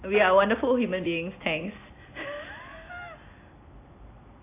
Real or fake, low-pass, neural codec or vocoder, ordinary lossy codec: real; 3.6 kHz; none; AAC, 24 kbps